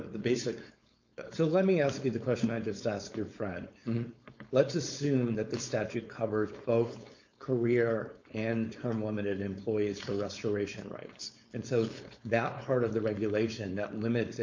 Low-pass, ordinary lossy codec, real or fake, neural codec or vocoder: 7.2 kHz; MP3, 48 kbps; fake; codec, 16 kHz, 4.8 kbps, FACodec